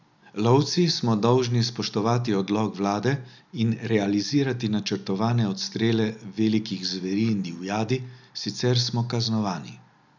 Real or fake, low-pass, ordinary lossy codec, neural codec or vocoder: real; 7.2 kHz; none; none